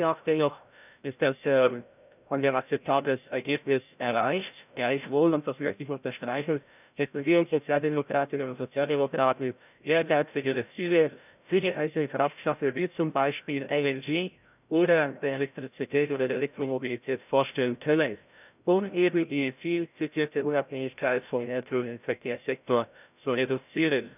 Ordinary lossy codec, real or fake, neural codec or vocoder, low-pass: none; fake; codec, 16 kHz, 0.5 kbps, FreqCodec, larger model; 3.6 kHz